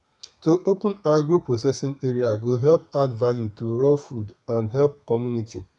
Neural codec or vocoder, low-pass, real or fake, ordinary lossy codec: codec, 32 kHz, 1.9 kbps, SNAC; 10.8 kHz; fake; none